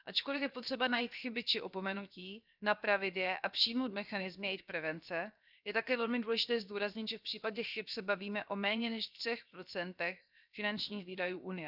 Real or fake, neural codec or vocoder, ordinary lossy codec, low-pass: fake; codec, 16 kHz, 0.7 kbps, FocalCodec; none; 5.4 kHz